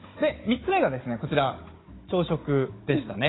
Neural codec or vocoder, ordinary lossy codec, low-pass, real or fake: codec, 16 kHz, 16 kbps, FunCodec, trained on Chinese and English, 50 frames a second; AAC, 16 kbps; 7.2 kHz; fake